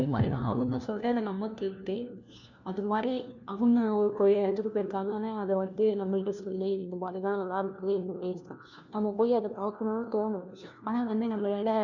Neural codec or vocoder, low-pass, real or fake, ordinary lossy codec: codec, 16 kHz, 1 kbps, FunCodec, trained on LibriTTS, 50 frames a second; 7.2 kHz; fake; none